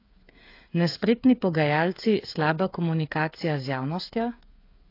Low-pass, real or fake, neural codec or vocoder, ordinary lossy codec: 5.4 kHz; fake; codec, 16 kHz, 8 kbps, FreqCodec, smaller model; AAC, 32 kbps